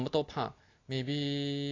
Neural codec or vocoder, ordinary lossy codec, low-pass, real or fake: none; AAC, 32 kbps; 7.2 kHz; real